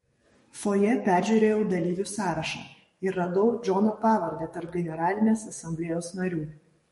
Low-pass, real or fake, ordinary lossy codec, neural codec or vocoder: 19.8 kHz; fake; MP3, 48 kbps; codec, 44.1 kHz, 7.8 kbps, DAC